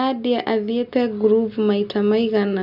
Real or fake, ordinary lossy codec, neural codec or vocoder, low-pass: real; none; none; 5.4 kHz